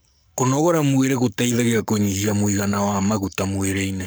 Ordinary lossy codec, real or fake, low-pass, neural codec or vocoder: none; fake; none; codec, 44.1 kHz, 7.8 kbps, Pupu-Codec